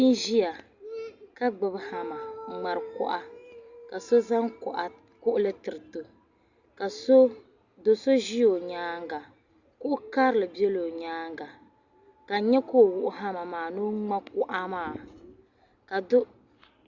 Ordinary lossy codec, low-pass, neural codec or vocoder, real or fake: Opus, 64 kbps; 7.2 kHz; none; real